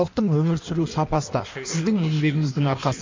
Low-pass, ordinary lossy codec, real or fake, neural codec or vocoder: 7.2 kHz; MP3, 48 kbps; fake; codec, 16 kHz in and 24 kHz out, 1.1 kbps, FireRedTTS-2 codec